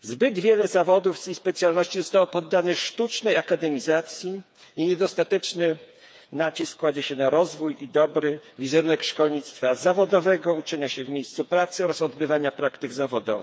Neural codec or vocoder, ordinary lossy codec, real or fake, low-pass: codec, 16 kHz, 4 kbps, FreqCodec, smaller model; none; fake; none